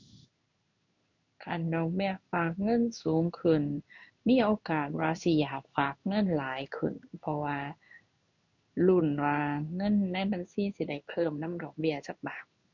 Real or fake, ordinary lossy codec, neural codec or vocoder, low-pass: fake; none; codec, 16 kHz in and 24 kHz out, 1 kbps, XY-Tokenizer; 7.2 kHz